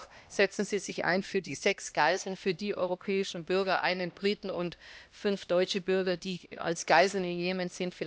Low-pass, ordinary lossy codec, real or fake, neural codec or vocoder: none; none; fake; codec, 16 kHz, 1 kbps, X-Codec, HuBERT features, trained on LibriSpeech